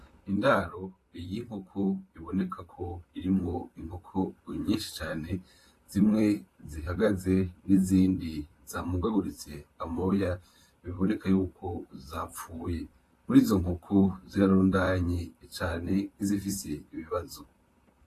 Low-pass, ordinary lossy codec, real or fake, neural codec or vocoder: 14.4 kHz; AAC, 48 kbps; fake; vocoder, 44.1 kHz, 128 mel bands, Pupu-Vocoder